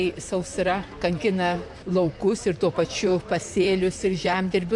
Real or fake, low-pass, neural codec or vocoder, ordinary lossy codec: fake; 10.8 kHz; vocoder, 44.1 kHz, 128 mel bands, Pupu-Vocoder; MP3, 64 kbps